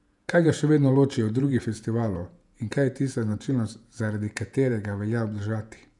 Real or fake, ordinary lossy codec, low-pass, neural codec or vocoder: fake; none; 10.8 kHz; vocoder, 48 kHz, 128 mel bands, Vocos